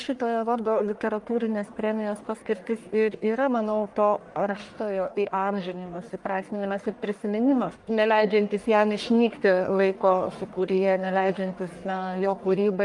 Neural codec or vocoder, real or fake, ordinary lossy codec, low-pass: codec, 44.1 kHz, 1.7 kbps, Pupu-Codec; fake; Opus, 32 kbps; 10.8 kHz